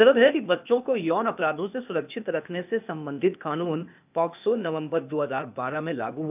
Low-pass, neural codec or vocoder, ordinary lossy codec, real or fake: 3.6 kHz; codec, 16 kHz, 0.8 kbps, ZipCodec; none; fake